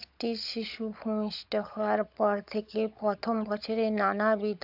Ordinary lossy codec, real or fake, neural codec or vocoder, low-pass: AAC, 48 kbps; fake; codec, 16 kHz, 16 kbps, FunCodec, trained on LibriTTS, 50 frames a second; 5.4 kHz